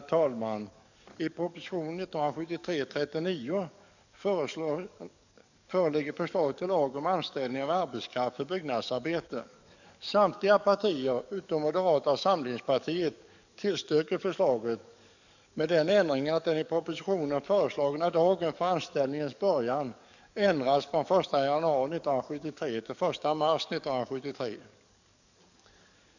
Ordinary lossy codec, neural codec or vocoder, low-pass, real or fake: none; codec, 44.1 kHz, 7.8 kbps, DAC; 7.2 kHz; fake